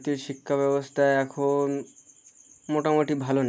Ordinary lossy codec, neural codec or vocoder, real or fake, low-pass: none; none; real; none